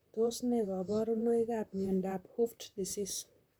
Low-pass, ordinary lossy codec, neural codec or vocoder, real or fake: none; none; vocoder, 44.1 kHz, 128 mel bands, Pupu-Vocoder; fake